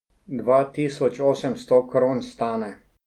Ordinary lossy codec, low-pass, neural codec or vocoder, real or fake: Opus, 32 kbps; 19.8 kHz; none; real